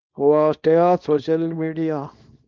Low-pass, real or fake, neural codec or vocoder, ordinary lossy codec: 7.2 kHz; fake; codec, 24 kHz, 0.9 kbps, WavTokenizer, small release; Opus, 32 kbps